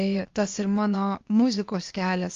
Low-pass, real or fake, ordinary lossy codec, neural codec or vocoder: 7.2 kHz; fake; Opus, 32 kbps; codec, 16 kHz, 0.8 kbps, ZipCodec